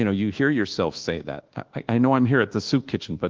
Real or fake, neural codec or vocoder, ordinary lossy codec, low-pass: fake; codec, 24 kHz, 1.2 kbps, DualCodec; Opus, 16 kbps; 7.2 kHz